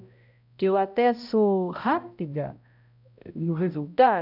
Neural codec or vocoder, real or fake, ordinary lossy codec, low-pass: codec, 16 kHz, 0.5 kbps, X-Codec, HuBERT features, trained on balanced general audio; fake; none; 5.4 kHz